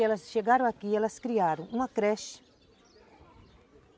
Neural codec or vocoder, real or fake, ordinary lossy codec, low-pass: none; real; none; none